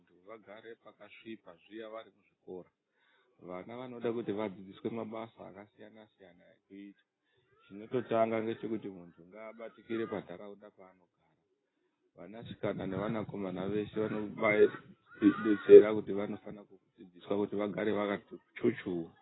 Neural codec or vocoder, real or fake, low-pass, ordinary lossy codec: none; real; 7.2 kHz; AAC, 16 kbps